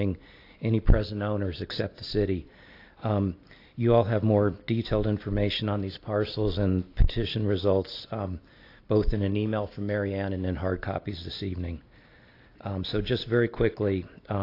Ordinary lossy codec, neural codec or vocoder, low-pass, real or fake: AAC, 32 kbps; none; 5.4 kHz; real